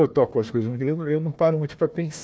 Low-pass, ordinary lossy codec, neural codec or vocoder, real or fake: none; none; codec, 16 kHz, 1 kbps, FunCodec, trained on Chinese and English, 50 frames a second; fake